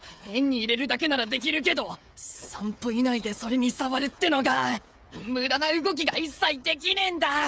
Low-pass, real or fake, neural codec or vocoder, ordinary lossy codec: none; fake; codec, 16 kHz, 8 kbps, FreqCodec, smaller model; none